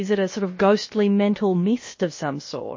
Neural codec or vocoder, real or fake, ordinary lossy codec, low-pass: codec, 16 kHz, about 1 kbps, DyCAST, with the encoder's durations; fake; MP3, 32 kbps; 7.2 kHz